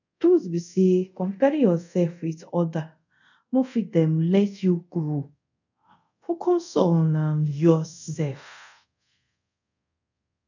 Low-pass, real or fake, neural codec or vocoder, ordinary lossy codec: 7.2 kHz; fake; codec, 24 kHz, 0.5 kbps, DualCodec; none